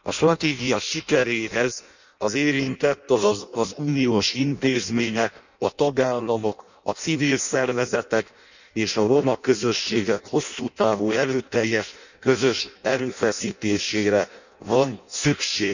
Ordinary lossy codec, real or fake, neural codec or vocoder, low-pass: none; fake; codec, 16 kHz in and 24 kHz out, 0.6 kbps, FireRedTTS-2 codec; 7.2 kHz